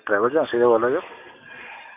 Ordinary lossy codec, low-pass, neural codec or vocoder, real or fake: none; 3.6 kHz; none; real